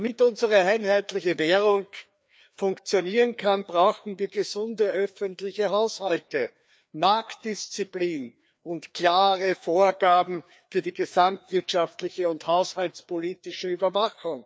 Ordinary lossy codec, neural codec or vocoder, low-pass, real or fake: none; codec, 16 kHz, 2 kbps, FreqCodec, larger model; none; fake